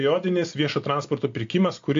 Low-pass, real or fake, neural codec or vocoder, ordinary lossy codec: 7.2 kHz; real; none; AAC, 48 kbps